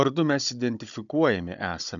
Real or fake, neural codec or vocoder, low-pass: fake; codec, 16 kHz, 16 kbps, FunCodec, trained on Chinese and English, 50 frames a second; 7.2 kHz